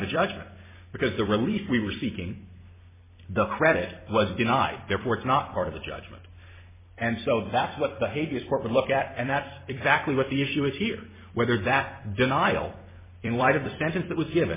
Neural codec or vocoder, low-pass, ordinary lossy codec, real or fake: vocoder, 44.1 kHz, 128 mel bands every 256 samples, BigVGAN v2; 3.6 kHz; MP3, 16 kbps; fake